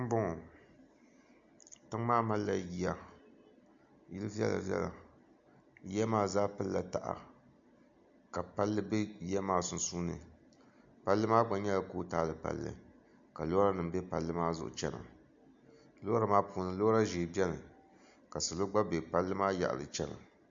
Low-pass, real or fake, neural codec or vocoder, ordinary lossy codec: 7.2 kHz; real; none; MP3, 64 kbps